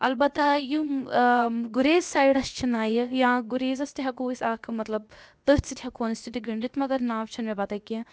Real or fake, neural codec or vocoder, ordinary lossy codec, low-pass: fake; codec, 16 kHz, 0.7 kbps, FocalCodec; none; none